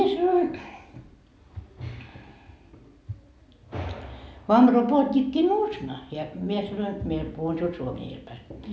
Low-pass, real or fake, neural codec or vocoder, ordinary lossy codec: none; real; none; none